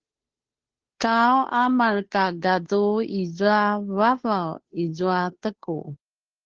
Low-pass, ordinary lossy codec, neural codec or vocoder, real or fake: 7.2 kHz; Opus, 16 kbps; codec, 16 kHz, 2 kbps, FunCodec, trained on Chinese and English, 25 frames a second; fake